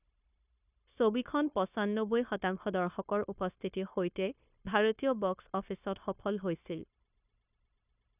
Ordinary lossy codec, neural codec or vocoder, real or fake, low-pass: none; codec, 16 kHz, 0.9 kbps, LongCat-Audio-Codec; fake; 3.6 kHz